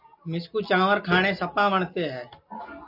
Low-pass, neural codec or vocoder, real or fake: 5.4 kHz; none; real